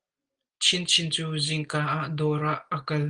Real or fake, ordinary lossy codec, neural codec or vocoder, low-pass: real; Opus, 24 kbps; none; 9.9 kHz